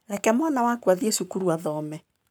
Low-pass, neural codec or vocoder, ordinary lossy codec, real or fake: none; codec, 44.1 kHz, 7.8 kbps, Pupu-Codec; none; fake